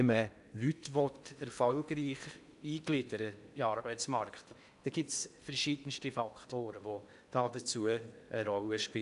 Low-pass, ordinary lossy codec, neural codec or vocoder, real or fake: 10.8 kHz; none; codec, 16 kHz in and 24 kHz out, 0.8 kbps, FocalCodec, streaming, 65536 codes; fake